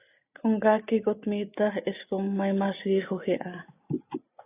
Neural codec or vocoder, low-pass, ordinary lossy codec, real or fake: none; 3.6 kHz; AAC, 24 kbps; real